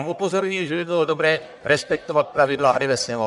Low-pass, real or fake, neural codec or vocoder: 10.8 kHz; fake; codec, 44.1 kHz, 1.7 kbps, Pupu-Codec